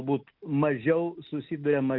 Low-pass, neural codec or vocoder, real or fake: 5.4 kHz; none; real